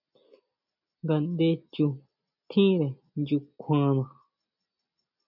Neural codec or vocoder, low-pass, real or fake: none; 5.4 kHz; real